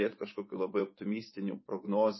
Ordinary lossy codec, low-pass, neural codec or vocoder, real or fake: MP3, 24 kbps; 7.2 kHz; vocoder, 24 kHz, 100 mel bands, Vocos; fake